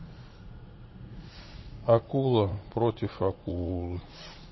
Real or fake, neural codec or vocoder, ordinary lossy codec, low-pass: fake; vocoder, 44.1 kHz, 80 mel bands, Vocos; MP3, 24 kbps; 7.2 kHz